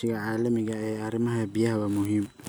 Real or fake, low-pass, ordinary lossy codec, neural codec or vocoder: real; none; none; none